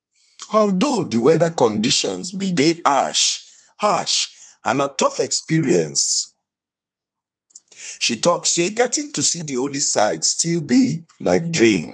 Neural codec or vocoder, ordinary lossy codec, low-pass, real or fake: codec, 24 kHz, 1 kbps, SNAC; none; 9.9 kHz; fake